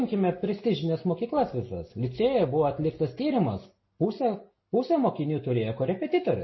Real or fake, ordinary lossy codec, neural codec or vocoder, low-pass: real; MP3, 24 kbps; none; 7.2 kHz